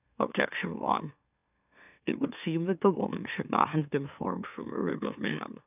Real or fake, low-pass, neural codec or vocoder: fake; 3.6 kHz; autoencoder, 44.1 kHz, a latent of 192 numbers a frame, MeloTTS